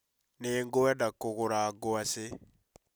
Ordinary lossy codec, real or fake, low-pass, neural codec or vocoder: none; real; none; none